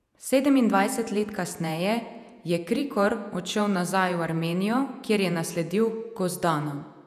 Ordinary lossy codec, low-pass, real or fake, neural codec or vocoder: none; 14.4 kHz; fake; vocoder, 48 kHz, 128 mel bands, Vocos